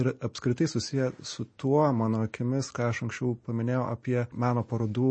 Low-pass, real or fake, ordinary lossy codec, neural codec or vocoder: 9.9 kHz; real; MP3, 32 kbps; none